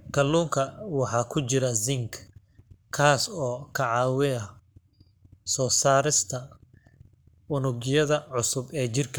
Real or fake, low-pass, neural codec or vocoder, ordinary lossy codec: fake; none; codec, 44.1 kHz, 7.8 kbps, Pupu-Codec; none